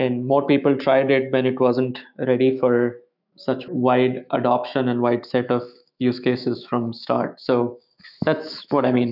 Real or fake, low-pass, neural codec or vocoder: fake; 5.4 kHz; autoencoder, 48 kHz, 128 numbers a frame, DAC-VAE, trained on Japanese speech